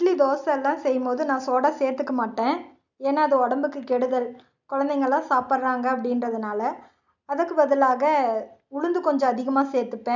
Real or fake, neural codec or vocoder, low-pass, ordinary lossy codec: real; none; 7.2 kHz; none